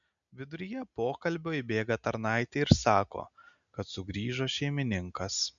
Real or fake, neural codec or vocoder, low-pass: real; none; 7.2 kHz